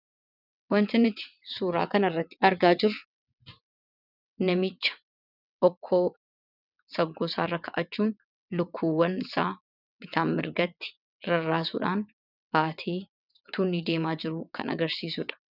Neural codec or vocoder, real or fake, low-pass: vocoder, 22.05 kHz, 80 mel bands, WaveNeXt; fake; 5.4 kHz